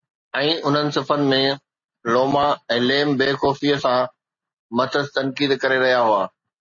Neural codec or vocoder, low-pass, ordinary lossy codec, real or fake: none; 7.2 kHz; MP3, 32 kbps; real